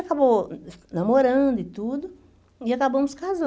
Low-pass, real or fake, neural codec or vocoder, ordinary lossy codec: none; real; none; none